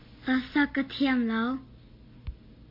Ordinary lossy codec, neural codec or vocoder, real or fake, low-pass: MP3, 32 kbps; none; real; 5.4 kHz